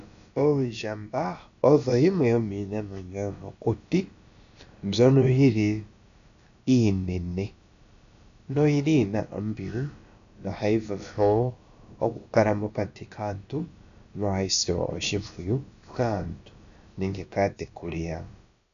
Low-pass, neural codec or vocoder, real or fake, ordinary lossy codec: 7.2 kHz; codec, 16 kHz, about 1 kbps, DyCAST, with the encoder's durations; fake; AAC, 96 kbps